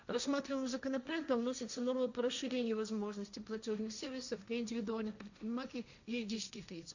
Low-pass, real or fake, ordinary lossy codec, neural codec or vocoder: 7.2 kHz; fake; none; codec, 16 kHz, 1.1 kbps, Voila-Tokenizer